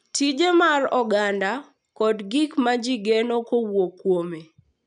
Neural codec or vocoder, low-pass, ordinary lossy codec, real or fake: none; 9.9 kHz; none; real